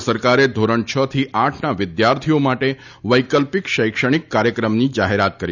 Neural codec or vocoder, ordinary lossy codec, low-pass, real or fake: none; none; 7.2 kHz; real